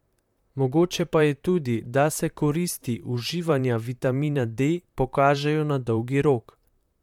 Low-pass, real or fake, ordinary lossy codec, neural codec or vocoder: 19.8 kHz; fake; MP3, 96 kbps; vocoder, 44.1 kHz, 128 mel bands, Pupu-Vocoder